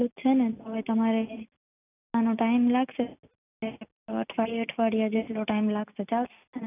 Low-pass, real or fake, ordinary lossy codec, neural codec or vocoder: 3.6 kHz; real; none; none